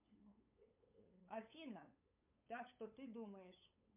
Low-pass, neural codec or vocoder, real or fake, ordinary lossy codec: 3.6 kHz; codec, 16 kHz, 8 kbps, FunCodec, trained on LibriTTS, 25 frames a second; fake; MP3, 32 kbps